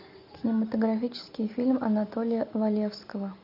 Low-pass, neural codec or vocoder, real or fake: 5.4 kHz; none; real